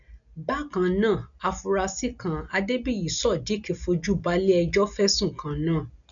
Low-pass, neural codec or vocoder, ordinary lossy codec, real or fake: 7.2 kHz; none; none; real